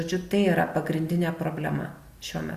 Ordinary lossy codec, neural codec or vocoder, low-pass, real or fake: Opus, 64 kbps; none; 14.4 kHz; real